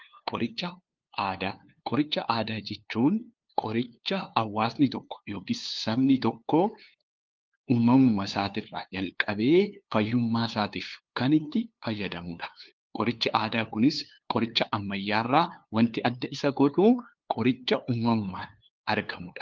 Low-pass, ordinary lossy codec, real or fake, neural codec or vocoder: 7.2 kHz; Opus, 32 kbps; fake; codec, 16 kHz, 2 kbps, FunCodec, trained on LibriTTS, 25 frames a second